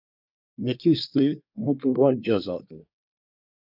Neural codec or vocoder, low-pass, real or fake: codec, 24 kHz, 1 kbps, SNAC; 5.4 kHz; fake